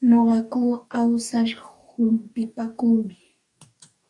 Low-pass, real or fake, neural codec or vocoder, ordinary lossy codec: 10.8 kHz; fake; codec, 44.1 kHz, 2.6 kbps, DAC; AAC, 64 kbps